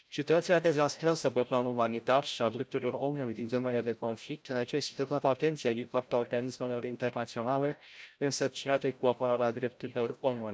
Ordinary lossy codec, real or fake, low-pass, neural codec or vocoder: none; fake; none; codec, 16 kHz, 0.5 kbps, FreqCodec, larger model